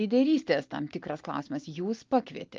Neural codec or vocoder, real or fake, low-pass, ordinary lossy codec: none; real; 7.2 kHz; Opus, 24 kbps